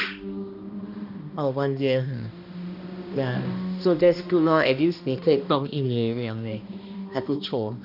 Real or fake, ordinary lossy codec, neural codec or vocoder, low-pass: fake; none; codec, 16 kHz, 1 kbps, X-Codec, HuBERT features, trained on balanced general audio; 5.4 kHz